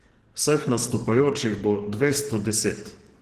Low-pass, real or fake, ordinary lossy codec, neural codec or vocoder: 14.4 kHz; fake; Opus, 16 kbps; codec, 44.1 kHz, 2.6 kbps, SNAC